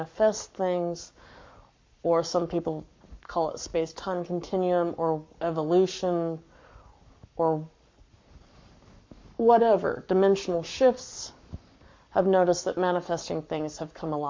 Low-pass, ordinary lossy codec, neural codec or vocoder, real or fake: 7.2 kHz; MP3, 48 kbps; codec, 44.1 kHz, 7.8 kbps, Pupu-Codec; fake